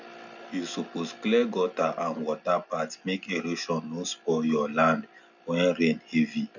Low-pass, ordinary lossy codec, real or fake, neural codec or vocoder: 7.2 kHz; none; real; none